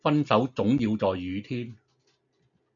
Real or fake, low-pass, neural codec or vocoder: real; 7.2 kHz; none